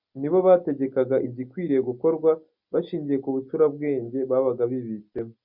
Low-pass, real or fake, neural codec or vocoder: 5.4 kHz; real; none